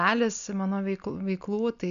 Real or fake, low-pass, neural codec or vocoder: real; 7.2 kHz; none